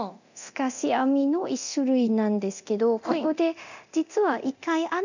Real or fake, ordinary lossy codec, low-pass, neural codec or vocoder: fake; none; 7.2 kHz; codec, 24 kHz, 0.9 kbps, DualCodec